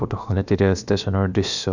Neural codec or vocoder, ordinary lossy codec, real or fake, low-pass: codec, 16 kHz, about 1 kbps, DyCAST, with the encoder's durations; none; fake; 7.2 kHz